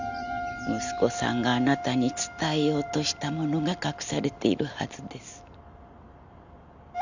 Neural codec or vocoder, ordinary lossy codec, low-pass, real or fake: none; none; 7.2 kHz; real